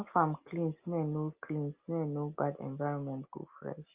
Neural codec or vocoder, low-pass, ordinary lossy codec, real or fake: none; 3.6 kHz; Opus, 32 kbps; real